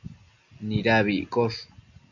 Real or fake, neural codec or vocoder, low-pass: real; none; 7.2 kHz